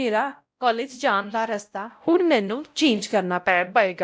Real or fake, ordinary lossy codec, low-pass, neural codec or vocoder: fake; none; none; codec, 16 kHz, 0.5 kbps, X-Codec, WavLM features, trained on Multilingual LibriSpeech